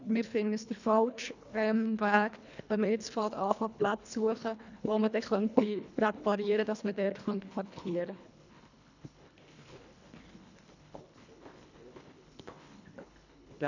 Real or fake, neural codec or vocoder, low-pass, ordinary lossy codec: fake; codec, 24 kHz, 1.5 kbps, HILCodec; 7.2 kHz; none